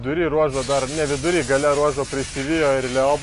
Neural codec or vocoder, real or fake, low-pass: none; real; 10.8 kHz